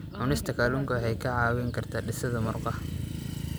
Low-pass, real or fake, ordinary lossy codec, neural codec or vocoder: none; real; none; none